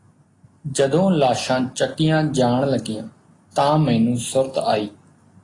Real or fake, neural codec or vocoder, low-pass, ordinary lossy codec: real; none; 10.8 kHz; AAC, 32 kbps